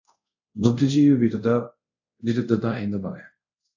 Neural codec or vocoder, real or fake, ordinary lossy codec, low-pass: codec, 24 kHz, 0.5 kbps, DualCodec; fake; AAC, 48 kbps; 7.2 kHz